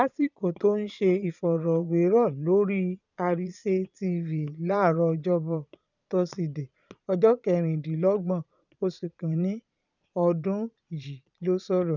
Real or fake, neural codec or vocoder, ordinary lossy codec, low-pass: fake; vocoder, 22.05 kHz, 80 mel bands, Vocos; none; 7.2 kHz